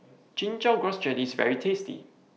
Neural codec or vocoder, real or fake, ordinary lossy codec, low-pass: none; real; none; none